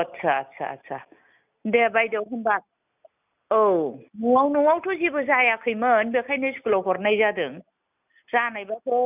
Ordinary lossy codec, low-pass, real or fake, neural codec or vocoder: none; 3.6 kHz; real; none